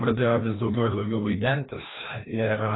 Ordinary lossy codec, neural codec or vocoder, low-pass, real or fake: AAC, 16 kbps; codec, 16 kHz, 1 kbps, FreqCodec, larger model; 7.2 kHz; fake